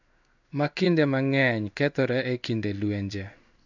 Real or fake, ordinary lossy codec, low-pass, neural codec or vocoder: fake; none; 7.2 kHz; codec, 16 kHz in and 24 kHz out, 1 kbps, XY-Tokenizer